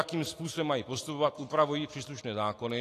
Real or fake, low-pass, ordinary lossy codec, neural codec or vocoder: real; 14.4 kHz; AAC, 48 kbps; none